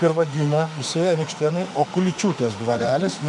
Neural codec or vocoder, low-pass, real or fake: autoencoder, 48 kHz, 32 numbers a frame, DAC-VAE, trained on Japanese speech; 10.8 kHz; fake